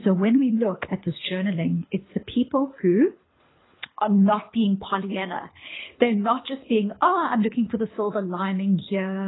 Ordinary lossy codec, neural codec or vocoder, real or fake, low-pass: AAC, 16 kbps; codec, 24 kHz, 6 kbps, HILCodec; fake; 7.2 kHz